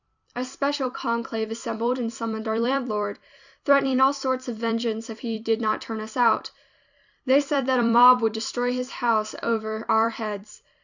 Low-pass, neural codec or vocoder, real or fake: 7.2 kHz; vocoder, 44.1 kHz, 128 mel bands every 256 samples, BigVGAN v2; fake